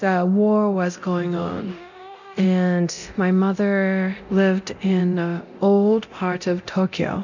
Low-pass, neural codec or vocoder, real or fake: 7.2 kHz; codec, 24 kHz, 0.9 kbps, DualCodec; fake